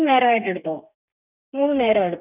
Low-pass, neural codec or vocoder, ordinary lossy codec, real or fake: 3.6 kHz; codec, 32 kHz, 1.9 kbps, SNAC; none; fake